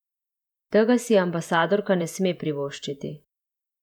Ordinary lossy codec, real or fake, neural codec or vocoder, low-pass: none; fake; vocoder, 48 kHz, 128 mel bands, Vocos; 19.8 kHz